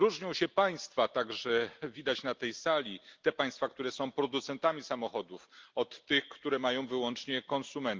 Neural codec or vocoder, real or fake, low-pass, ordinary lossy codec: none; real; 7.2 kHz; Opus, 32 kbps